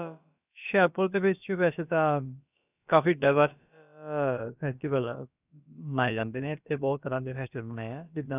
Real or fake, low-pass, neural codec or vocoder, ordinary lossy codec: fake; 3.6 kHz; codec, 16 kHz, about 1 kbps, DyCAST, with the encoder's durations; none